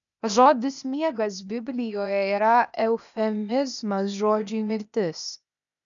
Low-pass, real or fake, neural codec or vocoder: 7.2 kHz; fake; codec, 16 kHz, 0.8 kbps, ZipCodec